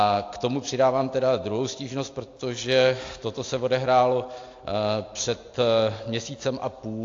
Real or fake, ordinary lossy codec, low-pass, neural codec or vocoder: real; AAC, 48 kbps; 7.2 kHz; none